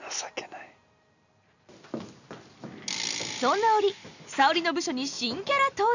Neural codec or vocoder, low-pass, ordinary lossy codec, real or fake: none; 7.2 kHz; none; real